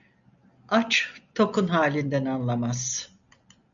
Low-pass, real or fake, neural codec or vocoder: 7.2 kHz; real; none